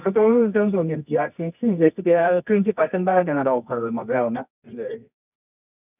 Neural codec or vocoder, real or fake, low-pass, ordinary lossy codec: codec, 24 kHz, 0.9 kbps, WavTokenizer, medium music audio release; fake; 3.6 kHz; none